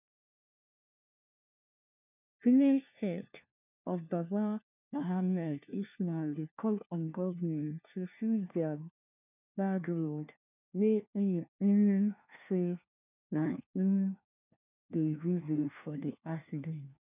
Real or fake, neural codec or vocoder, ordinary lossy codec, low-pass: fake; codec, 16 kHz, 1 kbps, FunCodec, trained on LibriTTS, 50 frames a second; none; 3.6 kHz